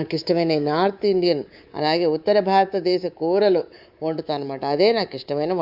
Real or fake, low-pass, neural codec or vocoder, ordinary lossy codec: fake; 5.4 kHz; codec, 24 kHz, 3.1 kbps, DualCodec; Opus, 64 kbps